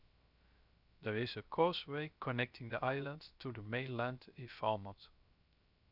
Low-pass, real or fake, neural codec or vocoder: 5.4 kHz; fake; codec, 16 kHz, 0.3 kbps, FocalCodec